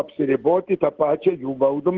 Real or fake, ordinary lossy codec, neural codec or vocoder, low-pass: real; Opus, 16 kbps; none; 7.2 kHz